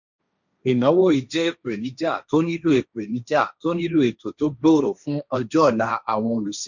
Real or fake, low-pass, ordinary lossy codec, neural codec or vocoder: fake; 7.2 kHz; none; codec, 16 kHz, 1.1 kbps, Voila-Tokenizer